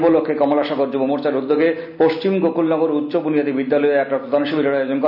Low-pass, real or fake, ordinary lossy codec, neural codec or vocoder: 5.4 kHz; real; none; none